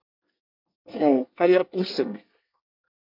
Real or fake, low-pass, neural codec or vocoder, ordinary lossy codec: fake; 5.4 kHz; codec, 24 kHz, 1 kbps, SNAC; MP3, 48 kbps